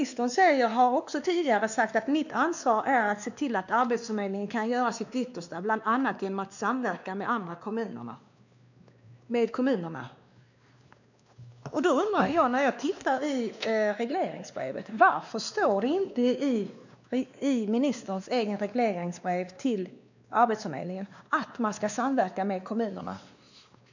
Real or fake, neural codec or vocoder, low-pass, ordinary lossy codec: fake; codec, 16 kHz, 2 kbps, X-Codec, WavLM features, trained on Multilingual LibriSpeech; 7.2 kHz; none